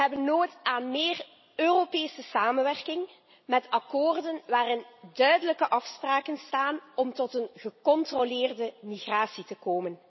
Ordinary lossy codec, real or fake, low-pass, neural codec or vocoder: MP3, 24 kbps; real; 7.2 kHz; none